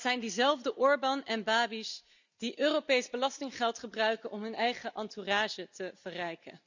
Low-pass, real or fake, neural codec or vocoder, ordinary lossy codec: 7.2 kHz; real; none; none